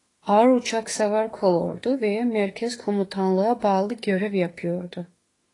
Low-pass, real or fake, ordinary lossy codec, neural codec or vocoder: 10.8 kHz; fake; AAC, 32 kbps; autoencoder, 48 kHz, 32 numbers a frame, DAC-VAE, trained on Japanese speech